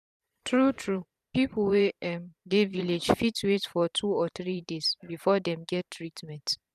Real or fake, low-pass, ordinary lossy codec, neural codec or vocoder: fake; 14.4 kHz; none; vocoder, 44.1 kHz, 128 mel bands every 512 samples, BigVGAN v2